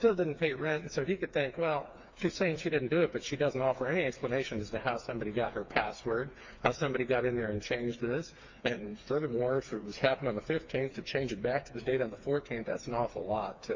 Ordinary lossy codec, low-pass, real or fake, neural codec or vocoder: MP3, 48 kbps; 7.2 kHz; fake; codec, 16 kHz, 4 kbps, FreqCodec, smaller model